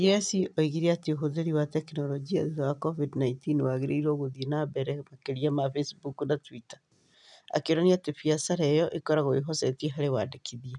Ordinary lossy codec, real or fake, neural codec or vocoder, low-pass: none; real; none; 10.8 kHz